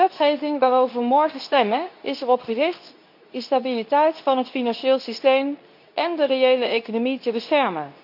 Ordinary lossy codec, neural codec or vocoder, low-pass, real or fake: none; codec, 24 kHz, 0.9 kbps, WavTokenizer, medium speech release version 2; 5.4 kHz; fake